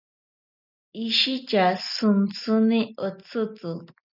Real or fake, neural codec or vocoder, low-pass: real; none; 5.4 kHz